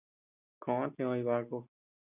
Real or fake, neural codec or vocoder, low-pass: real; none; 3.6 kHz